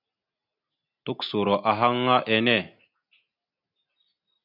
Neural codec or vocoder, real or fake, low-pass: none; real; 5.4 kHz